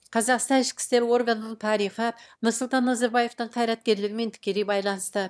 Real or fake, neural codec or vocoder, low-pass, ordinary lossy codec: fake; autoencoder, 22.05 kHz, a latent of 192 numbers a frame, VITS, trained on one speaker; none; none